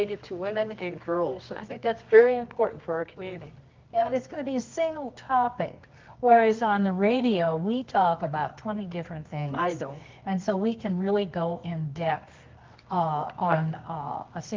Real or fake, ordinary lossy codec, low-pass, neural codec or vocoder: fake; Opus, 24 kbps; 7.2 kHz; codec, 24 kHz, 0.9 kbps, WavTokenizer, medium music audio release